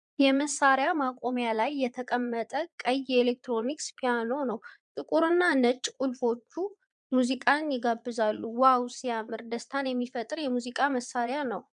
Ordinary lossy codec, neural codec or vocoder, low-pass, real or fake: MP3, 96 kbps; vocoder, 22.05 kHz, 80 mel bands, Vocos; 9.9 kHz; fake